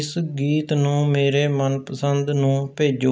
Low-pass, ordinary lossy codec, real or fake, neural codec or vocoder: none; none; real; none